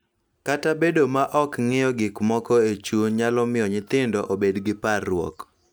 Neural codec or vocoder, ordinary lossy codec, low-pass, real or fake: none; none; none; real